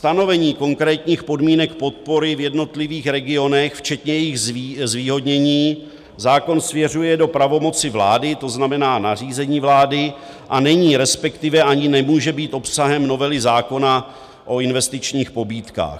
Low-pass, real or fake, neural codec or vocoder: 14.4 kHz; real; none